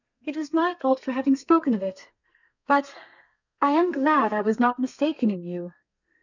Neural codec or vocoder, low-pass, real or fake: codec, 32 kHz, 1.9 kbps, SNAC; 7.2 kHz; fake